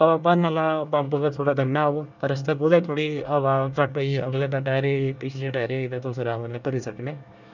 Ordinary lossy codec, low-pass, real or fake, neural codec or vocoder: none; 7.2 kHz; fake; codec, 24 kHz, 1 kbps, SNAC